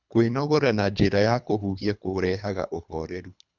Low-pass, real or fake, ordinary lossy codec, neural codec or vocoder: 7.2 kHz; fake; none; codec, 24 kHz, 3 kbps, HILCodec